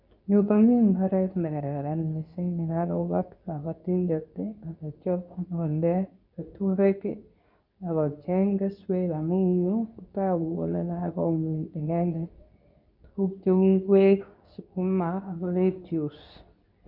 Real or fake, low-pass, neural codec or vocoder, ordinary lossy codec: fake; 5.4 kHz; codec, 24 kHz, 0.9 kbps, WavTokenizer, medium speech release version 2; none